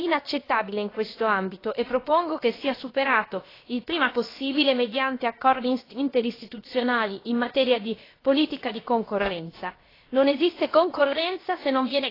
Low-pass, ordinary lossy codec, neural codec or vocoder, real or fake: 5.4 kHz; AAC, 24 kbps; codec, 16 kHz, about 1 kbps, DyCAST, with the encoder's durations; fake